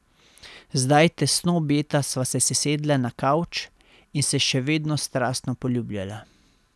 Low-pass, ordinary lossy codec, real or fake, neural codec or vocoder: none; none; real; none